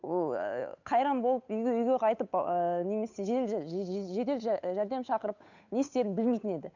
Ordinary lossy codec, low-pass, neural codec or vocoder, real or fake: none; 7.2 kHz; codec, 16 kHz, 8 kbps, FunCodec, trained on Chinese and English, 25 frames a second; fake